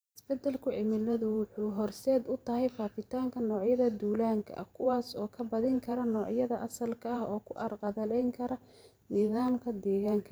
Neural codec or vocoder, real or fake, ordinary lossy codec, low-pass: vocoder, 44.1 kHz, 128 mel bands every 512 samples, BigVGAN v2; fake; none; none